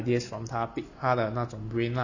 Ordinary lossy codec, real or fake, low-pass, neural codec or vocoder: none; real; 7.2 kHz; none